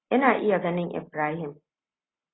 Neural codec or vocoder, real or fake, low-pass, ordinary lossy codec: none; real; 7.2 kHz; AAC, 16 kbps